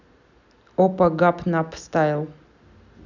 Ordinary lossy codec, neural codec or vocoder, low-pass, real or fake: none; none; 7.2 kHz; real